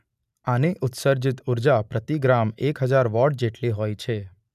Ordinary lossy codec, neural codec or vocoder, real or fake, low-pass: none; none; real; 14.4 kHz